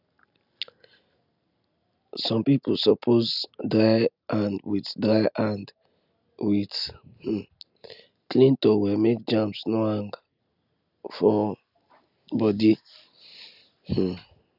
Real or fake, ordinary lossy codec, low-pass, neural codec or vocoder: real; none; 5.4 kHz; none